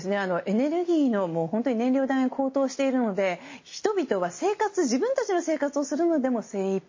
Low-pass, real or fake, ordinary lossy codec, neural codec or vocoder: 7.2 kHz; real; MP3, 32 kbps; none